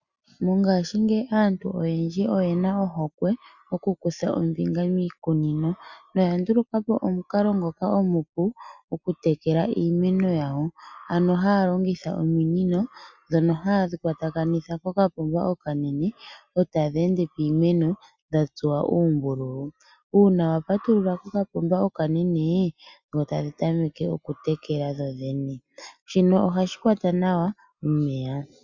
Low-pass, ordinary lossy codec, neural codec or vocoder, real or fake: 7.2 kHz; Opus, 64 kbps; none; real